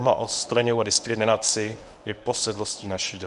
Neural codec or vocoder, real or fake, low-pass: codec, 24 kHz, 0.9 kbps, WavTokenizer, medium speech release version 1; fake; 10.8 kHz